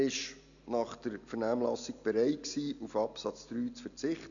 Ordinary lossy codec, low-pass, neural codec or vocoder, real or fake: none; 7.2 kHz; none; real